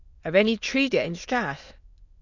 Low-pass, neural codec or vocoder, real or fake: 7.2 kHz; autoencoder, 22.05 kHz, a latent of 192 numbers a frame, VITS, trained on many speakers; fake